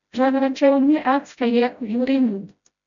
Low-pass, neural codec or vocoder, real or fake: 7.2 kHz; codec, 16 kHz, 0.5 kbps, FreqCodec, smaller model; fake